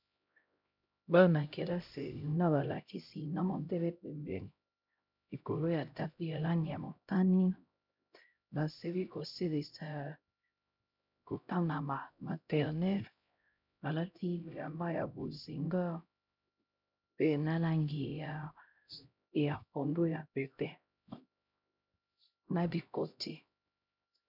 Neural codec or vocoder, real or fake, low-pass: codec, 16 kHz, 0.5 kbps, X-Codec, HuBERT features, trained on LibriSpeech; fake; 5.4 kHz